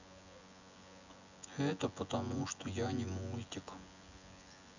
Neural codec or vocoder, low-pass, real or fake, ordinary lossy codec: vocoder, 24 kHz, 100 mel bands, Vocos; 7.2 kHz; fake; none